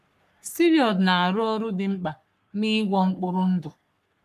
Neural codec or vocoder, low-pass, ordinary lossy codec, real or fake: codec, 44.1 kHz, 3.4 kbps, Pupu-Codec; 14.4 kHz; none; fake